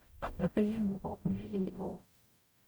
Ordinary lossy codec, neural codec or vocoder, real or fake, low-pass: none; codec, 44.1 kHz, 0.9 kbps, DAC; fake; none